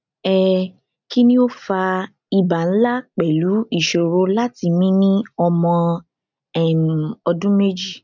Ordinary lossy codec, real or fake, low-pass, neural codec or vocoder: none; real; 7.2 kHz; none